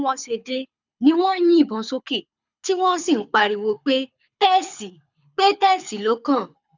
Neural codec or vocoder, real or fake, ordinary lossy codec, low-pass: codec, 24 kHz, 6 kbps, HILCodec; fake; none; 7.2 kHz